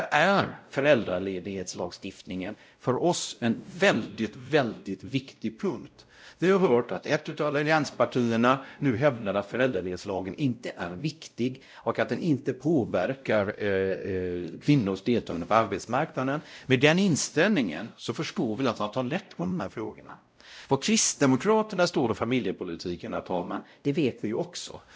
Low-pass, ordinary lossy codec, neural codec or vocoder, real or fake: none; none; codec, 16 kHz, 0.5 kbps, X-Codec, WavLM features, trained on Multilingual LibriSpeech; fake